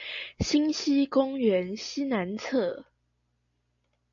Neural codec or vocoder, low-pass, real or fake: none; 7.2 kHz; real